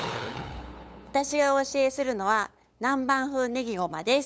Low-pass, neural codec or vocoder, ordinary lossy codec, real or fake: none; codec, 16 kHz, 8 kbps, FunCodec, trained on LibriTTS, 25 frames a second; none; fake